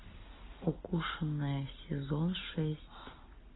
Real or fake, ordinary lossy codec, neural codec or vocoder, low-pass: real; AAC, 16 kbps; none; 7.2 kHz